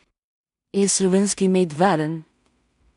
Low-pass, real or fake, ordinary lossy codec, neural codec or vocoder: 10.8 kHz; fake; none; codec, 16 kHz in and 24 kHz out, 0.4 kbps, LongCat-Audio-Codec, two codebook decoder